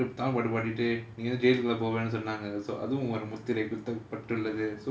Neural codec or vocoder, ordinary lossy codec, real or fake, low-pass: none; none; real; none